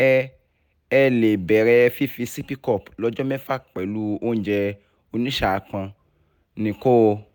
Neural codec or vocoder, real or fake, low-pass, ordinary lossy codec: none; real; 19.8 kHz; none